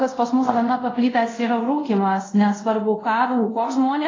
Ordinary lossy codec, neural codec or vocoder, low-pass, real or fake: AAC, 32 kbps; codec, 24 kHz, 0.5 kbps, DualCodec; 7.2 kHz; fake